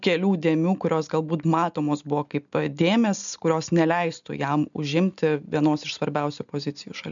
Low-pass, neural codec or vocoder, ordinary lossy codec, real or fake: 7.2 kHz; none; MP3, 96 kbps; real